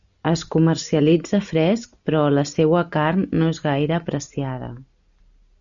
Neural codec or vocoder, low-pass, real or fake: none; 7.2 kHz; real